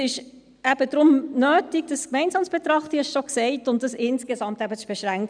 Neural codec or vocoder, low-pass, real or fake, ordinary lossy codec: none; 9.9 kHz; real; none